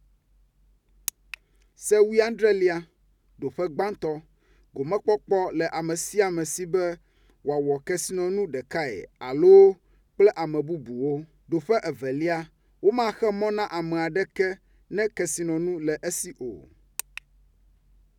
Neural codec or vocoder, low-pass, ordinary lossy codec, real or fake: none; 19.8 kHz; none; real